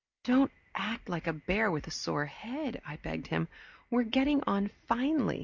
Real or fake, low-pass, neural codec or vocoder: real; 7.2 kHz; none